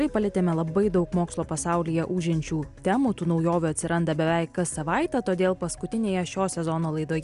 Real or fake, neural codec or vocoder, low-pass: real; none; 10.8 kHz